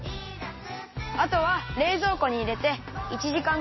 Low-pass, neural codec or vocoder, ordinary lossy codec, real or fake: 7.2 kHz; none; MP3, 24 kbps; real